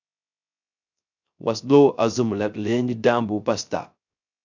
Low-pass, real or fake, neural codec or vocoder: 7.2 kHz; fake; codec, 16 kHz, 0.3 kbps, FocalCodec